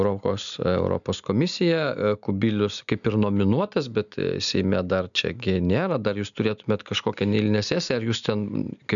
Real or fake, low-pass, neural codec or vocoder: real; 7.2 kHz; none